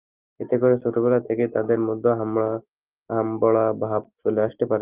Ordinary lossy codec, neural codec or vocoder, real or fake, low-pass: Opus, 32 kbps; none; real; 3.6 kHz